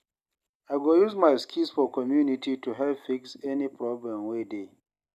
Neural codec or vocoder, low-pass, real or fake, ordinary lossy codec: none; 14.4 kHz; real; none